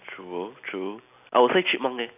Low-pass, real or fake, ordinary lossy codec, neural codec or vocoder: 3.6 kHz; real; none; none